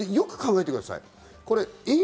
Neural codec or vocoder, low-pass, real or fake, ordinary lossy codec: none; none; real; none